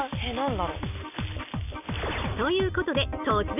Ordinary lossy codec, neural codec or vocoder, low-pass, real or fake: none; none; 3.6 kHz; real